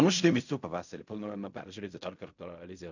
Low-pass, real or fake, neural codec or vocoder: 7.2 kHz; fake; codec, 16 kHz in and 24 kHz out, 0.4 kbps, LongCat-Audio-Codec, fine tuned four codebook decoder